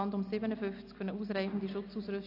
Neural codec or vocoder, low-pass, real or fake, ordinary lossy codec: none; 5.4 kHz; real; AAC, 32 kbps